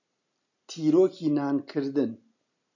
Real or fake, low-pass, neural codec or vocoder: real; 7.2 kHz; none